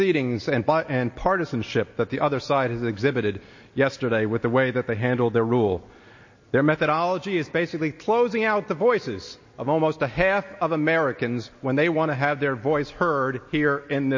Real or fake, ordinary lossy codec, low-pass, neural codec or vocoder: real; MP3, 32 kbps; 7.2 kHz; none